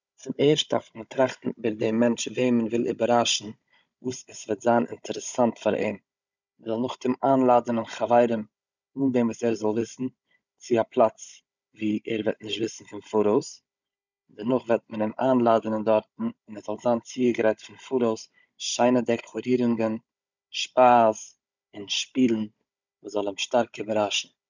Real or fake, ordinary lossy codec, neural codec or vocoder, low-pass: fake; none; codec, 16 kHz, 16 kbps, FunCodec, trained on Chinese and English, 50 frames a second; 7.2 kHz